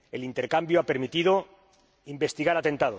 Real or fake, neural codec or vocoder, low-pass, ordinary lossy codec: real; none; none; none